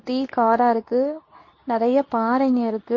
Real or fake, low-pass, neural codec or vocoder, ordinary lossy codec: fake; 7.2 kHz; codec, 24 kHz, 0.9 kbps, WavTokenizer, medium speech release version 2; MP3, 32 kbps